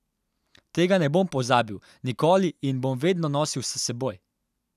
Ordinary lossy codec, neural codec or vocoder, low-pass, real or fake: none; none; 14.4 kHz; real